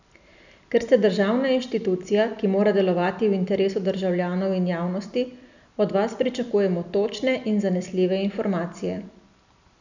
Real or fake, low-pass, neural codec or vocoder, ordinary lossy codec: real; 7.2 kHz; none; none